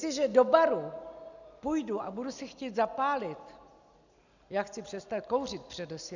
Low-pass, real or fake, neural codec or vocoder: 7.2 kHz; real; none